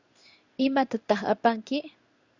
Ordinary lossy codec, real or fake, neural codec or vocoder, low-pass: Opus, 64 kbps; fake; codec, 16 kHz in and 24 kHz out, 1 kbps, XY-Tokenizer; 7.2 kHz